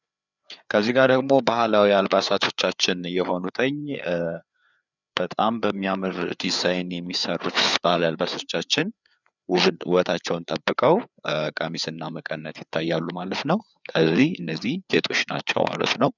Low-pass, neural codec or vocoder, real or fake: 7.2 kHz; codec, 16 kHz, 4 kbps, FreqCodec, larger model; fake